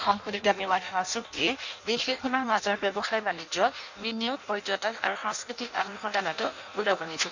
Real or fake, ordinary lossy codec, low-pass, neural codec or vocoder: fake; none; 7.2 kHz; codec, 16 kHz in and 24 kHz out, 0.6 kbps, FireRedTTS-2 codec